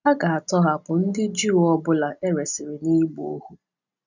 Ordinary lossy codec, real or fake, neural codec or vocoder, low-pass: none; real; none; 7.2 kHz